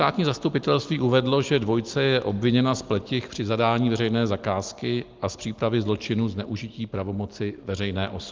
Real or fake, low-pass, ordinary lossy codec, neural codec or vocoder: real; 7.2 kHz; Opus, 32 kbps; none